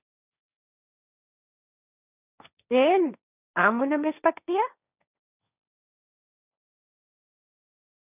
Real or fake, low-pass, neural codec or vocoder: fake; 3.6 kHz; codec, 16 kHz, 1.1 kbps, Voila-Tokenizer